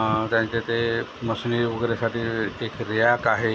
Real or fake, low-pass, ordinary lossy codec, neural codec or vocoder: real; none; none; none